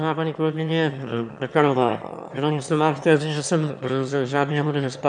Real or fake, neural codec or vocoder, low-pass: fake; autoencoder, 22.05 kHz, a latent of 192 numbers a frame, VITS, trained on one speaker; 9.9 kHz